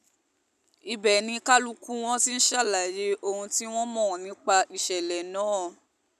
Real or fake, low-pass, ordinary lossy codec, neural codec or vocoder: real; 14.4 kHz; none; none